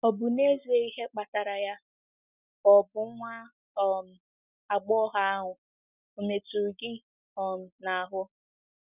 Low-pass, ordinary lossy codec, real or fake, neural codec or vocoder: 3.6 kHz; none; real; none